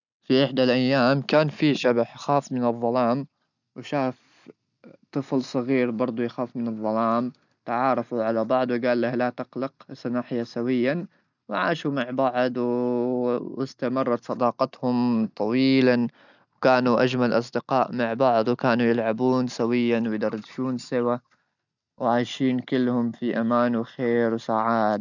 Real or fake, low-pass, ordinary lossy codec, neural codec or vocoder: real; 7.2 kHz; none; none